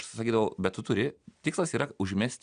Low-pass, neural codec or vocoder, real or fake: 9.9 kHz; none; real